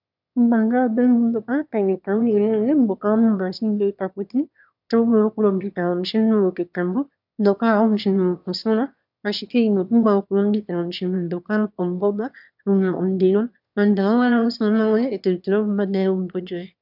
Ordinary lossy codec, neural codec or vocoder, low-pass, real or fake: AAC, 48 kbps; autoencoder, 22.05 kHz, a latent of 192 numbers a frame, VITS, trained on one speaker; 5.4 kHz; fake